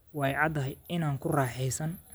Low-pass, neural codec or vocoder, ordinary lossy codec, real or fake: none; none; none; real